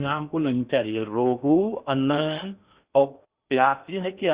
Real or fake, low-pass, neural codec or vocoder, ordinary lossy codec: fake; 3.6 kHz; codec, 16 kHz in and 24 kHz out, 0.8 kbps, FocalCodec, streaming, 65536 codes; Opus, 64 kbps